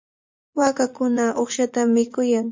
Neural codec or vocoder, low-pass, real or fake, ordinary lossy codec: vocoder, 44.1 kHz, 80 mel bands, Vocos; 7.2 kHz; fake; MP3, 48 kbps